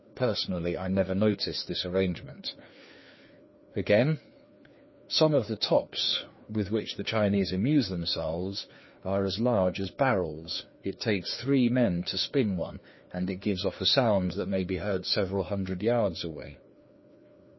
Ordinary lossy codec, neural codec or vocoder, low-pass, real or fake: MP3, 24 kbps; codec, 16 kHz, 2 kbps, FreqCodec, larger model; 7.2 kHz; fake